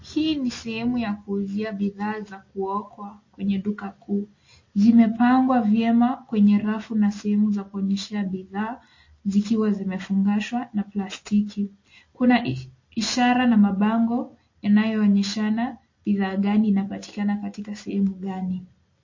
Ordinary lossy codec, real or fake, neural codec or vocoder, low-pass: MP3, 32 kbps; real; none; 7.2 kHz